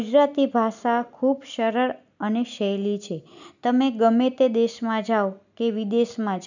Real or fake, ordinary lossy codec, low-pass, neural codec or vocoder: real; none; 7.2 kHz; none